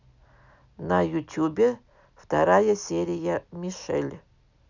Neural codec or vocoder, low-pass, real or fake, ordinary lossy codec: none; 7.2 kHz; real; none